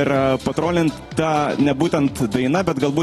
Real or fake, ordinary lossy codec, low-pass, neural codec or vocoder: real; AAC, 32 kbps; 19.8 kHz; none